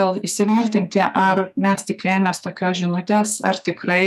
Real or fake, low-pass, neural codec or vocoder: fake; 14.4 kHz; codec, 44.1 kHz, 2.6 kbps, SNAC